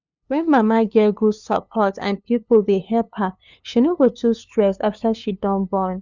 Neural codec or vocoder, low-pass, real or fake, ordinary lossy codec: codec, 16 kHz, 2 kbps, FunCodec, trained on LibriTTS, 25 frames a second; 7.2 kHz; fake; Opus, 64 kbps